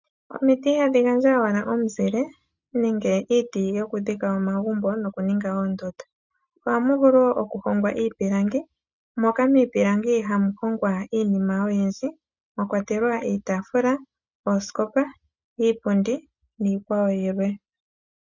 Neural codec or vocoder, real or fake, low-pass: none; real; 7.2 kHz